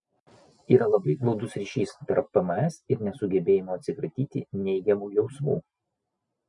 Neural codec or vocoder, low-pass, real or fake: none; 10.8 kHz; real